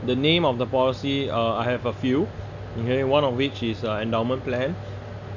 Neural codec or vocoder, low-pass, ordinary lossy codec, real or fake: none; 7.2 kHz; none; real